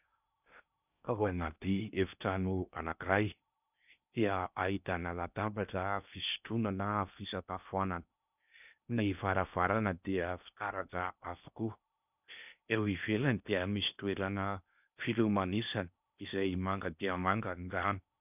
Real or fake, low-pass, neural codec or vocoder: fake; 3.6 kHz; codec, 16 kHz in and 24 kHz out, 0.6 kbps, FocalCodec, streaming, 2048 codes